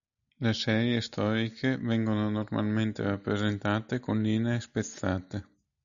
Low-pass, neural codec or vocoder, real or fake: 7.2 kHz; none; real